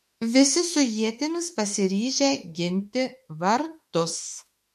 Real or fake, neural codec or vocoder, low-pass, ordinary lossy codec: fake; autoencoder, 48 kHz, 32 numbers a frame, DAC-VAE, trained on Japanese speech; 14.4 kHz; MP3, 64 kbps